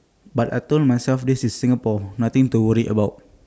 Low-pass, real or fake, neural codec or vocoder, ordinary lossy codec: none; real; none; none